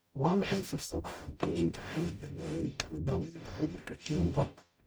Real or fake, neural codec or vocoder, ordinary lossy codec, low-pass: fake; codec, 44.1 kHz, 0.9 kbps, DAC; none; none